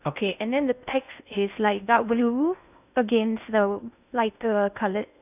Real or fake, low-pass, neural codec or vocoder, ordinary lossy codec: fake; 3.6 kHz; codec, 16 kHz in and 24 kHz out, 0.6 kbps, FocalCodec, streaming, 2048 codes; none